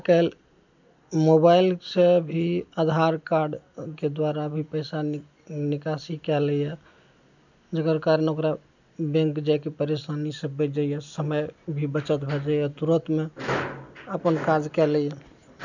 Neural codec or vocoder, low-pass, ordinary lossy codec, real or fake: none; 7.2 kHz; none; real